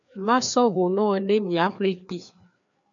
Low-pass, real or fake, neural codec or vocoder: 7.2 kHz; fake; codec, 16 kHz, 2 kbps, FreqCodec, larger model